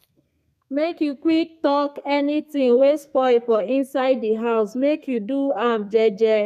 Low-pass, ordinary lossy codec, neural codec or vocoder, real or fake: 14.4 kHz; none; codec, 32 kHz, 1.9 kbps, SNAC; fake